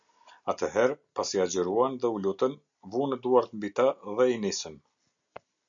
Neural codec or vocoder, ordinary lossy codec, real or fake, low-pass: none; MP3, 96 kbps; real; 7.2 kHz